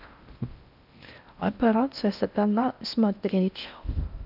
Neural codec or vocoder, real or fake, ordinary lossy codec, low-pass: codec, 16 kHz in and 24 kHz out, 0.6 kbps, FocalCodec, streaming, 4096 codes; fake; none; 5.4 kHz